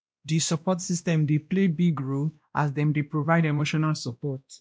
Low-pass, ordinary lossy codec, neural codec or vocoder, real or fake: none; none; codec, 16 kHz, 1 kbps, X-Codec, WavLM features, trained on Multilingual LibriSpeech; fake